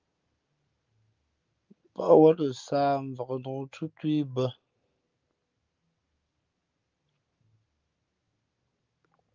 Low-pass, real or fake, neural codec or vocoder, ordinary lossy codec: 7.2 kHz; real; none; Opus, 24 kbps